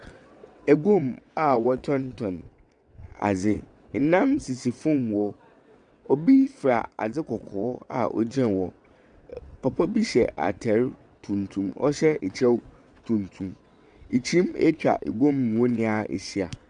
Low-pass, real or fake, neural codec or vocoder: 9.9 kHz; fake; vocoder, 22.05 kHz, 80 mel bands, WaveNeXt